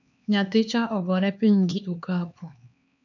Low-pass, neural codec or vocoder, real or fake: 7.2 kHz; codec, 16 kHz, 2 kbps, X-Codec, HuBERT features, trained on LibriSpeech; fake